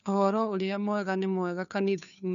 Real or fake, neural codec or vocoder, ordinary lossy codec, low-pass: fake; codec, 16 kHz, 2 kbps, FunCodec, trained on Chinese and English, 25 frames a second; none; 7.2 kHz